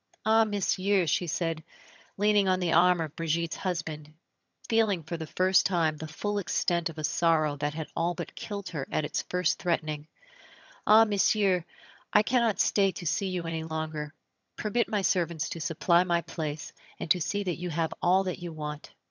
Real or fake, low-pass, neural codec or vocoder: fake; 7.2 kHz; vocoder, 22.05 kHz, 80 mel bands, HiFi-GAN